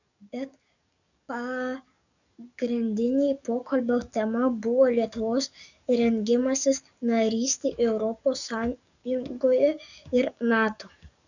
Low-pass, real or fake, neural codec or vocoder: 7.2 kHz; fake; codec, 44.1 kHz, 7.8 kbps, DAC